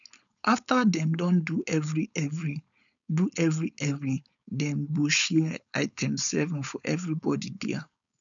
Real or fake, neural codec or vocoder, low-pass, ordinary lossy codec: fake; codec, 16 kHz, 4.8 kbps, FACodec; 7.2 kHz; none